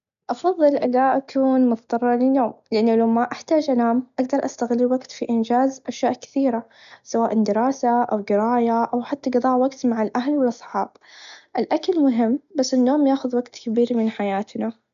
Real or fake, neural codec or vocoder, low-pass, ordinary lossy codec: real; none; 7.2 kHz; MP3, 96 kbps